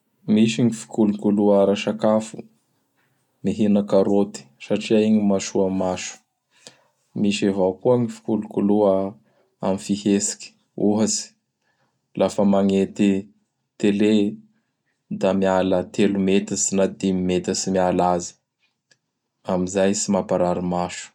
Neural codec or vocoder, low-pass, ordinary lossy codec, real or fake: none; 19.8 kHz; none; real